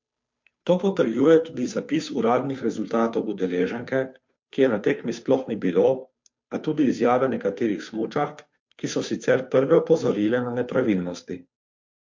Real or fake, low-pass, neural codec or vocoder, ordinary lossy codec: fake; 7.2 kHz; codec, 16 kHz, 2 kbps, FunCodec, trained on Chinese and English, 25 frames a second; MP3, 48 kbps